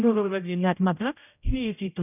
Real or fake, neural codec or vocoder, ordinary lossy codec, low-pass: fake; codec, 16 kHz, 0.5 kbps, X-Codec, HuBERT features, trained on general audio; none; 3.6 kHz